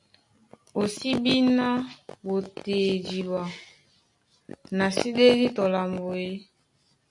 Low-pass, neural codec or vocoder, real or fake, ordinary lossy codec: 10.8 kHz; none; real; AAC, 64 kbps